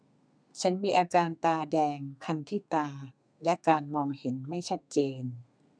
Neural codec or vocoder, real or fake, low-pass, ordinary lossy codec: codec, 32 kHz, 1.9 kbps, SNAC; fake; 9.9 kHz; none